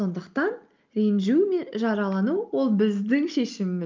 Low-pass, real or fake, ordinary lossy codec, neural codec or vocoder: 7.2 kHz; real; Opus, 24 kbps; none